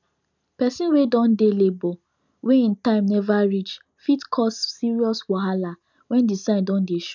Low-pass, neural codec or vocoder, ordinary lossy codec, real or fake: 7.2 kHz; none; none; real